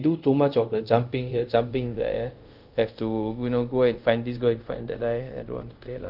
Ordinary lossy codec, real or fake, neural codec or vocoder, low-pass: Opus, 24 kbps; fake; codec, 24 kHz, 0.5 kbps, DualCodec; 5.4 kHz